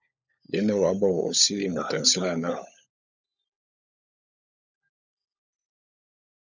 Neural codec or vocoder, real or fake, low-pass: codec, 16 kHz, 16 kbps, FunCodec, trained on LibriTTS, 50 frames a second; fake; 7.2 kHz